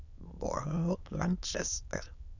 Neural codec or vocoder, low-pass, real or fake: autoencoder, 22.05 kHz, a latent of 192 numbers a frame, VITS, trained on many speakers; 7.2 kHz; fake